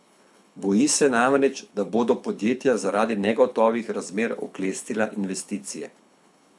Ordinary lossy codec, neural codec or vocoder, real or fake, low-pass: none; codec, 24 kHz, 6 kbps, HILCodec; fake; none